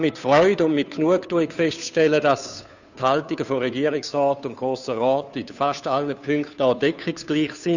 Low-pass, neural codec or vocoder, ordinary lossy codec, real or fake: 7.2 kHz; codec, 16 kHz, 2 kbps, FunCodec, trained on Chinese and English, 25 frames a second; none; fake